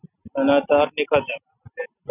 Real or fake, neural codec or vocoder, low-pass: real; none; 3.6 kHz